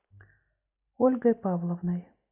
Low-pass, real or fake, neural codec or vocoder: 3.6 kHz; real; none